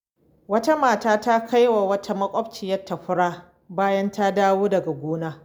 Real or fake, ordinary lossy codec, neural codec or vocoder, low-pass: real; none; none; none